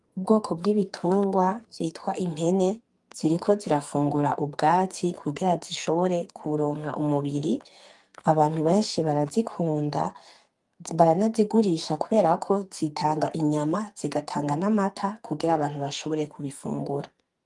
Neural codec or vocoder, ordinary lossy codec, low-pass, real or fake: codec, 44.1 kHz, 2.6 kbps, SNAC; Opus, 32 kbps; 10.8 kHz; fake